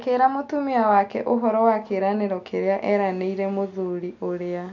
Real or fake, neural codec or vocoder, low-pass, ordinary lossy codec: real; none; 7.2 kHz; none